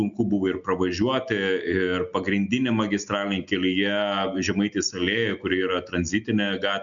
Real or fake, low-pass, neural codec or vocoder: real; 7.2 kHz; none